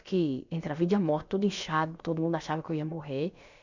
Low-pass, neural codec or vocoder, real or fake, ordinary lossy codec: 7.2 kHz; codec, 16 kHz, about 1 kbps, DyCAST, with the encoder's durations; fake; Opus, 64 kbps